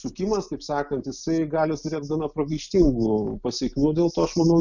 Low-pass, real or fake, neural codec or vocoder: 7.2 kHz; real; none